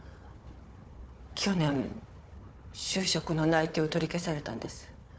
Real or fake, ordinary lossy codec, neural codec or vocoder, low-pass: fake; none; codec, 16 kHz, 4 kbps, FunCodec, trained on Chinese and English, 50 frames a second; none